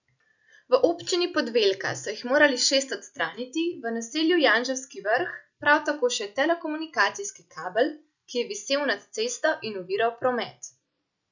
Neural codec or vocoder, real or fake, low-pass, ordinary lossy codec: none; real; 7.2 kHz; none